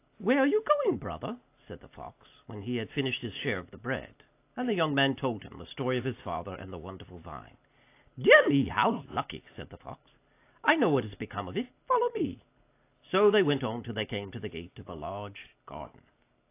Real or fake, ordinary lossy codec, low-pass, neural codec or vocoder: real; AAC, 24 kbps; 3.6 kHz; none